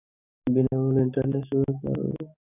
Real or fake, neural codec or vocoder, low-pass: fake; codec, 44.1 kHz, 7.8 kbps, DAC; 3.6 kHz